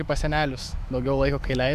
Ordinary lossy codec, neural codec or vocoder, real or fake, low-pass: MP3, 96 kbps; autoencoder, 48 kHz, 128 numbers a frame, DAC-VAE, trained on Japanese speech; fake; 14.4 kHz